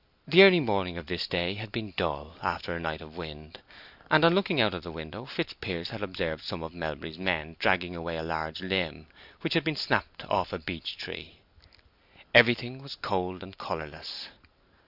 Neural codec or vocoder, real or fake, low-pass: none; real; 5.4 kHz